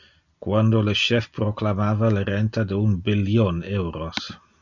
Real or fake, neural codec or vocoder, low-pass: real; none; 7.2 kHz